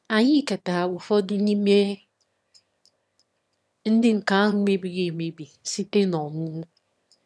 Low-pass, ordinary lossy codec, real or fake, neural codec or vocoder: none; none; fake; autoencoder, 22.05 kHz, a latent of 192 numbers a frame, VITS, trained on one speaker